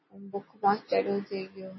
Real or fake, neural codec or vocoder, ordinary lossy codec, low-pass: real; none; MP3, 24 kbps; 7.2 kHz